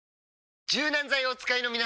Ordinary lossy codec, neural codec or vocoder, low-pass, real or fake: none; none; none; real